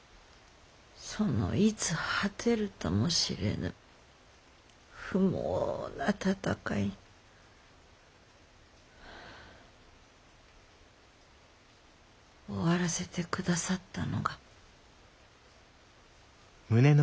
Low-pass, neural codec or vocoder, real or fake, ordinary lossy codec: none; none; real; none